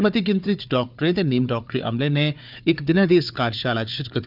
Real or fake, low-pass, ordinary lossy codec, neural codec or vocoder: fake; 5.4 kHz; none; codec, 16 kHz, 4 kbps, FunCodec, trained on Chinese and English, 50 frames a second